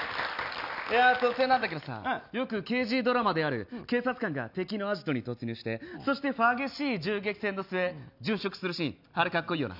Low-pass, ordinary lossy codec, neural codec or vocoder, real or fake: 5.4 kHz; none; none; real